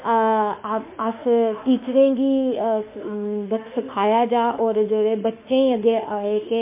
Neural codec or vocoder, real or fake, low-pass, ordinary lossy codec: autoencoder, 48 kHz, 32 numbers a frame, DAC-VAE, trained on Japanese speech; fake; 3.6 kHz; MP3, 24 kbps